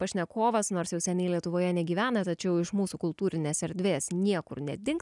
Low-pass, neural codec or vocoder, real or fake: 10.8 kHz; none; real